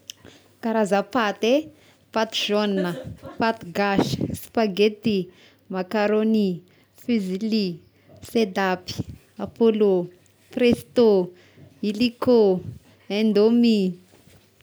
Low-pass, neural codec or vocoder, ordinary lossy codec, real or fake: none; none; none; real